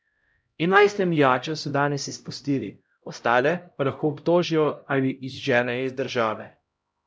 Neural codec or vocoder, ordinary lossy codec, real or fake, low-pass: codec, 16 kHz, 0.5 kbps, X-Codec, HuBERT features, trained on LibriSpeech; none; fake; none